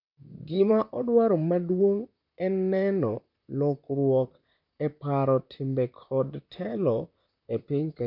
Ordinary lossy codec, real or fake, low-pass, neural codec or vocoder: none; fake; 5.4 kHz; vocoder, 44.1 kHz, 128 mel bands, Pupu-Vocoder